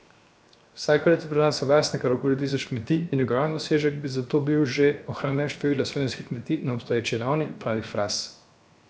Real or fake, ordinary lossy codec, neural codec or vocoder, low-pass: fake; none; codec, 16 kHz, 0.7 kbps, FocalCodec; none